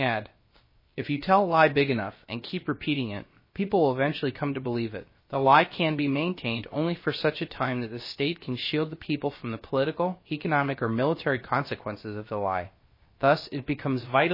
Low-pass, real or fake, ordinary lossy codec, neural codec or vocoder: 5.4 kHz; fake; MP3, 24 kbps; codec, 16 kHz, about 1 kbps, DyCAST, with the encoder's durations